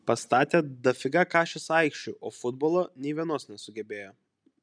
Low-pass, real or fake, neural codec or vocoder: 9.9 kHz; real; none